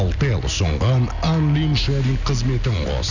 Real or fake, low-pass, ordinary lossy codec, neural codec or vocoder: real; 7.2 kHz; none; none